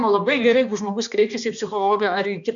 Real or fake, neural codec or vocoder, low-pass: fake; codec, 16 kHz, 2 kbps, X-Codec, HuBERT features, trained on balanced general audio; 7.2 kHz